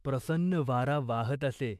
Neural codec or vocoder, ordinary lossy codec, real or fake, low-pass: autoencoder, 48 kHz, 128 numbers a frame, DAC-VAE, trained on Japanese speech; MP3, 96 kbps; fake; 14.4 kHz